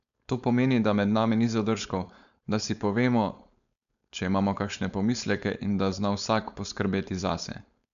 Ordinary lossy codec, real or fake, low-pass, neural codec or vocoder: none; fake; 7.2 kHz; codec, 16 kHz, 4.8 kbps, FACodec